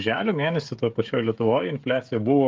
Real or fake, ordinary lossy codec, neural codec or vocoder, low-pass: fake; Opus, 32 kbps; codec, 16 kHz, 16 kbps, FreqCodec, smaller model; 7.2 kHz